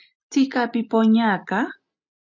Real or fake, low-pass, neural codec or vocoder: real; 7.2 kHz; none